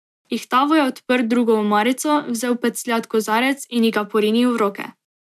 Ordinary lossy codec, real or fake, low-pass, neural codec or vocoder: MP3, 96 kbps; real; 14.4 kHz; none